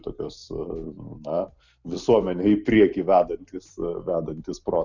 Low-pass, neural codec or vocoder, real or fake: 7.2 kHz; none; real